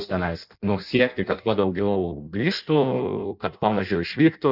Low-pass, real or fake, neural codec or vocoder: 5.4 kHz; fake; codec, 16 kHz in and 24 kHz out, 0.6 kbps, FireRedTTS-2 codec